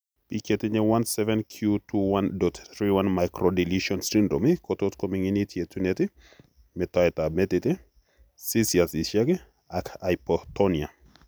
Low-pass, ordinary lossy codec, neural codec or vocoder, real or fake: none; none; none; real